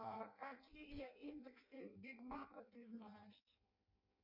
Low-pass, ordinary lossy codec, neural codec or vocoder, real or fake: 5.4 kHz; MP3, 32 kbps; codec, 16 kHz in and 24 kHz out, 0.6 kbps, FireRedTTS-2 codec; fake